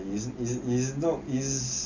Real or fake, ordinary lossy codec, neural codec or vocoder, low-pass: real; none; none; 7.2 kHz